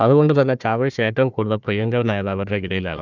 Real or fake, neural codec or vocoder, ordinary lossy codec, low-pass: fake; codec, 16 kHz, 1 kbps, FunCodec, trained on Chinese and English, 50 frames a second; none; 7.2 kHz